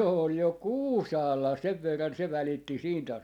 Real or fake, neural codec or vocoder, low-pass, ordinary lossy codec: real; none; 19.8 kHz; none